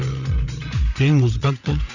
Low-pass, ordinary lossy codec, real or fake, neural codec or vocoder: 7.2 kHz; none; fake; codec, 16 kHz, 8 kbps, FreqCodec, larger model